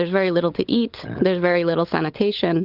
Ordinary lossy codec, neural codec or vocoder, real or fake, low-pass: Opus, 32 kbps; codec, 16 kHz, 16 kbps, FunCodec, trained on LibriTTS, 50 frames a second; fake; 5.4 kHz